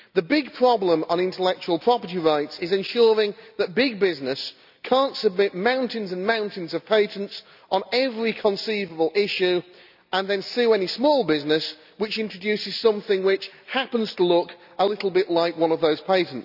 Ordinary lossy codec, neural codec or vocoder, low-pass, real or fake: none; none; 5.4 kHz; real